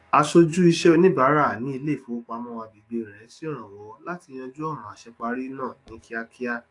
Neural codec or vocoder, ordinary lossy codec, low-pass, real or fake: codec, 44.1 kHz, 7.8 kbps, DAC; AAC, 48 kbps; 10.8 kHz; fake